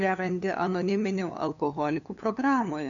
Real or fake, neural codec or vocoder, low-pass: fake; codec, 16 kHz, 2 kbps, FunCodec, trained on LibriTTS, 25 frames a second; 7.2 kHz